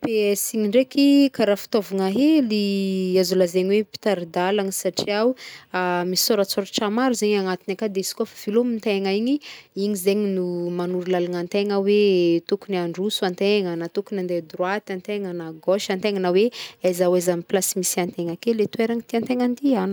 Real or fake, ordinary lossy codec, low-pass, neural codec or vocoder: real; none; none; none